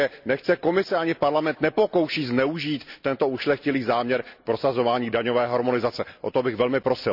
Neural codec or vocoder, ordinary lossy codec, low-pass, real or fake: none; none; 5.4 kHz; real